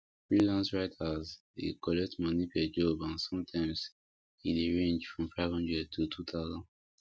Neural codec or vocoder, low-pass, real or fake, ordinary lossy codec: none; none; real; none